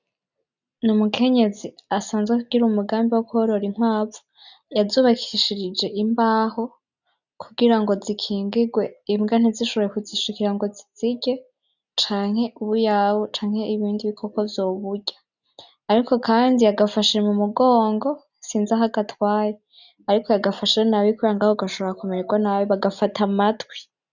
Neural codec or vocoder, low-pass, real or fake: none; 7.2 kHz; real